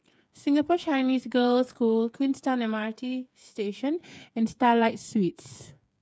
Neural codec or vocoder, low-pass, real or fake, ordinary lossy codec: codec, 16 kHz, 8 kbps, FreqCodec, smaller model; none; fake; none